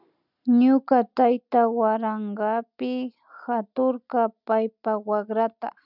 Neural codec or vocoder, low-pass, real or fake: none; 5.4 kHz; real